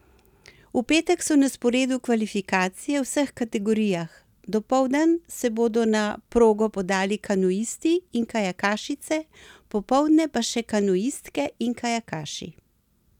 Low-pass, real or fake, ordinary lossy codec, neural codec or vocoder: 19.8 kHz; real; none; none